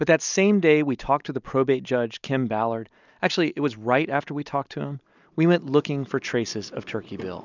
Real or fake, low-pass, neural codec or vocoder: real; 7.2 kHz; none